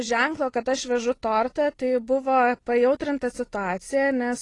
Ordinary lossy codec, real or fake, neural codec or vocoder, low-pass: AAC, 32 kbps; real; none; 10.8 kHz